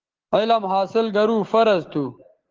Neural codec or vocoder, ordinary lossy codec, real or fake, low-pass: none; Opus, 16 kbps; real; 7.2 kHz